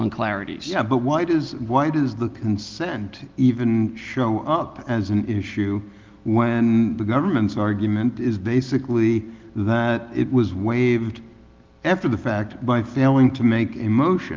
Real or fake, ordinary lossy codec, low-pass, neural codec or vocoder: real; Opus, 32 kbps; 7.2 kHz; none